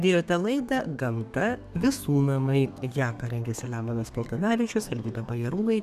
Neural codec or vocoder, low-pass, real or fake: codec, 32 kHz, 1.9 kbps, SNAC; 14.4 kHz; fake